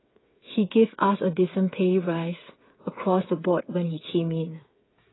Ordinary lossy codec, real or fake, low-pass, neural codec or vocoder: AAC, 16 kbps; fake; 7.2 kHz; codec, 16 kHz, 8 kbps, FreqCodec, smaller model